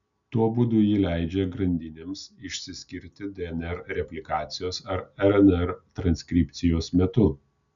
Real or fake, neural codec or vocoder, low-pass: real; none; 7.2 kHz